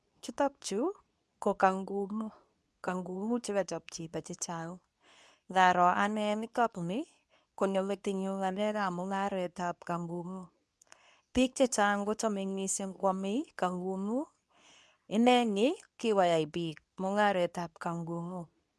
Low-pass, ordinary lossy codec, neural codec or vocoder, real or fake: none; none; codec, 24 kHz, 0.9 kbps, WavTokenizer, medium speech release version 2; fake